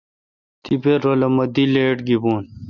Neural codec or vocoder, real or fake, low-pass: none; real; 7.2 kHz